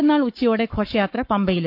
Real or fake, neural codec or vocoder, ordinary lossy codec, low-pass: fake; codec, 44.1 kHz, 7.8 kbps, Pupu-Codec; none; 5.4 kHz